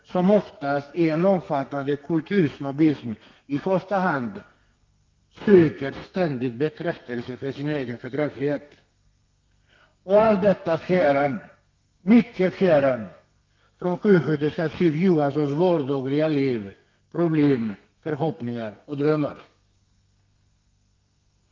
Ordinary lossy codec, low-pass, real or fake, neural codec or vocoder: Opus, 24 kbps; 7.2 kHz; fake; codec, 32 kHz, 1.9 kbps, SNAC